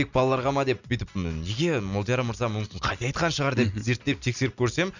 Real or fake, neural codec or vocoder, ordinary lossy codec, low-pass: real; none; none; 7.2 kHz